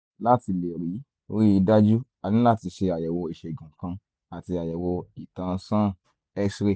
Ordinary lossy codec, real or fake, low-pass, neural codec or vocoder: none; real; none; none